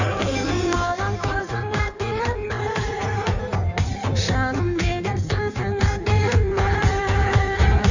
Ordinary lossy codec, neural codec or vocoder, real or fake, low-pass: none; codec, 16 kHz in and 24 kHz out, 1.1 kbps, FireRedTTS-2 codec; fake; 7.2 kHz